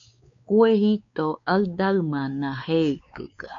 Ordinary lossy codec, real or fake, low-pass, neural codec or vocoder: AAC, 48 kbps; fake; 7.2 kHz; codec, 16 kHz, 4 kbps, X-Codec, WavLM features, trained on Multilingual LibriSpeech